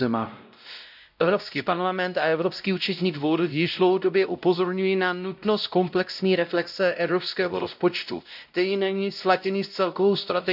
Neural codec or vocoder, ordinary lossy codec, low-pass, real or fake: codec, 16 kHz, 0.5 kbps, X-Codec, WavLM features, trained on Multilingual LibriSpeech; none; 5.4 kHz; fake